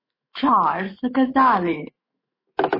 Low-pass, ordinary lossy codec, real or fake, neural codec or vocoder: 5.4 kHz; MP3, 24 kbps; fake; autoencoder, 48 kHz, 128 numbers a frame, DAC-VAE, trained on Japanese speech